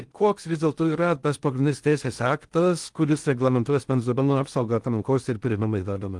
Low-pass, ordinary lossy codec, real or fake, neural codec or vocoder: 10.8 kHz; Opus, 24 kbps; fake; codec, 16 kHz in and 24 kHz out, 0.6 kbps, FocalCodec, streaming, 2048 codes